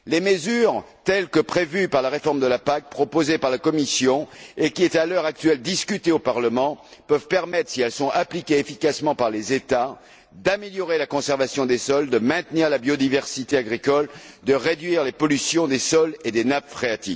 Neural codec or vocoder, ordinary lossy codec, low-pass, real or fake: none; none; none; real